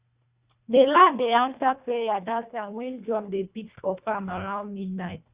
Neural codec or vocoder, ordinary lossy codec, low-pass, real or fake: codec, 24 kHz, 1.5 kbps, HILCodec; Opus, 64 kbps; 3.6 kHz; fake